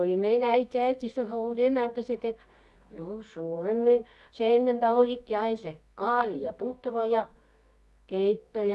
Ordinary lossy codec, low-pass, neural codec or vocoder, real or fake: none; none; codec, 24 kHz, 0.9 kbps, WavTokenizer, medium music audio release; fake